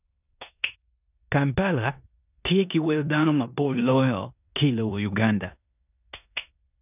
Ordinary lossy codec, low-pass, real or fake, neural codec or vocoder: none; 3.6 kHz; fake; codec, 16 kHz in and 24 kHz out, 0.9 kbps, LongCat-Audio-Codec, four codebook decoder